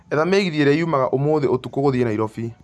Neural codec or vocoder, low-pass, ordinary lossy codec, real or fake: none; none; none; real